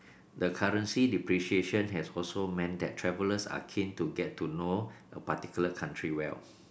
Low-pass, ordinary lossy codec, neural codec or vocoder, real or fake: none; none; none; real